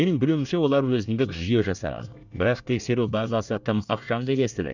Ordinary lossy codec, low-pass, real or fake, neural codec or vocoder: none; 7.2 kHz; fake; codec, 24 kHz, 1 kbps, SNAC